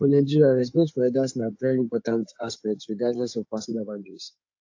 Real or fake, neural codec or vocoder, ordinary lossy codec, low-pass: fake; codec, 16 kHz in and 24 kHz out, 2.2 kbps, FireRedTTS-2 codec; AAC, 48 kbps; 7.2 kHz